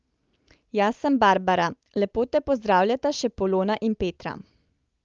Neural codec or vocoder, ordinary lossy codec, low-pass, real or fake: none; Opus, 32 kbps; 7.2 kHz; real